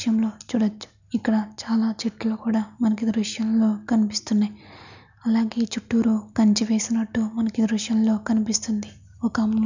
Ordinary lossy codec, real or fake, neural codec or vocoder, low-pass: none; real; none; 7.2 kHz